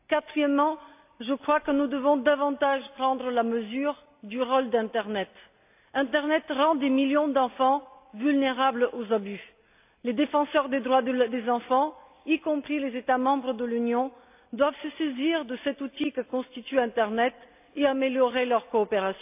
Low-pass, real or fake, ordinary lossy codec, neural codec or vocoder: 3.6 kHz; real; AAC, 32 kbps; none